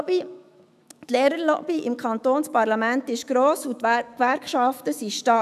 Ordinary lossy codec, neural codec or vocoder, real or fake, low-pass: none; autoencoder, 48 kHz, 128 numbers a frame, DAC-VAE, trained on Japanese speech; fake; 14.4 kHz